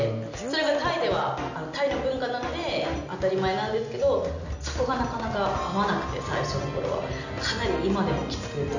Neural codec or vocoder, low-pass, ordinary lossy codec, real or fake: none; 7.2 kHz; none; real